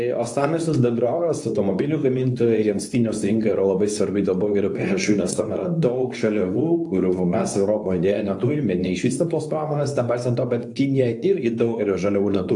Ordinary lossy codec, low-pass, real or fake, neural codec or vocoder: AAC, 64 kbps; 10.8 kHz; fake; codec, 24 kHz, 0.9 kbps, WavTokenizer, medium speech release version 2